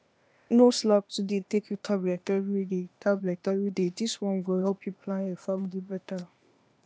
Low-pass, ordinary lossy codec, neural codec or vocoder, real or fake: none; none; codec, 16 kHz, 0.8 kbps, ZipCodec; fake